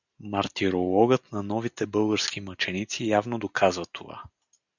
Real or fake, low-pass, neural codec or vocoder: real; 7.2 kHz; none